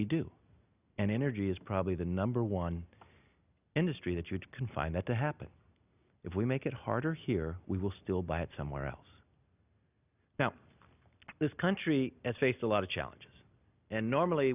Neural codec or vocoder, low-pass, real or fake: none; 3.6 kHz; real